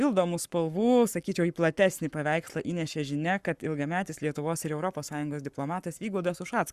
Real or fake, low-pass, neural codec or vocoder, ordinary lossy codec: fake; 14.4 kHz; codec, 44.1 kHz, 7.8 kbps, Pupu-Codec; Opus, 64 kbps